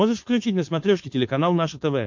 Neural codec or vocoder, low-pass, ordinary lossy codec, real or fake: codec, 16 kHz, 4.8 kbps, FACodec; 7.2 kHz; MP3, 48 kbps; fake